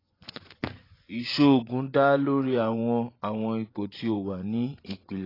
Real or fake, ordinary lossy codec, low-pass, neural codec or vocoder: fake; AAC, 24 kbps; 5.4 kHz; vocoder, 24 kHz, 100 mel bands, Vocos